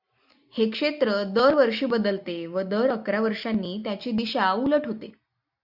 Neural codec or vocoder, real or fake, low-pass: none; real; 5.4 kHz